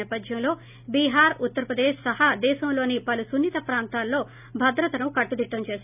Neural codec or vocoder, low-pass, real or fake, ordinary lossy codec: none; 3.6 kHz; real; none